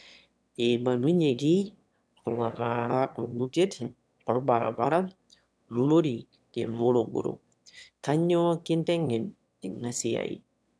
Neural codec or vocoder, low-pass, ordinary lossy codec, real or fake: autoencoder, 22.05 kHz, a latent of 192 numbers a frame, VITS, trained on one speaker; none; none; fake